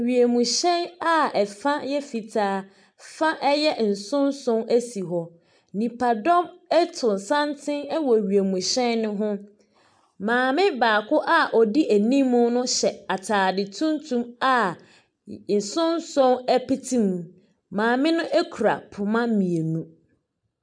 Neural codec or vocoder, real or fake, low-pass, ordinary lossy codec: none; real; 9.9 kHz; MP3, 96 kbps